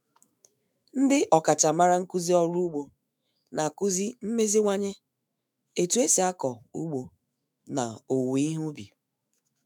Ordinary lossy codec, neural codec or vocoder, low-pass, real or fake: none; autoencoder, 48 kHz, 128 numbers a frame, DAC-VAE, trained on Japanese speech; none; fake